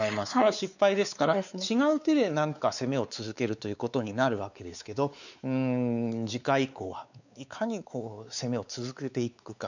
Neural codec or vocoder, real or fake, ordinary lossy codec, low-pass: codec, 16 kHz, 4 kbps, X-Codec, WavLM features, trained on Multilingual LibriSpeech; fake; none; 7.2 kHz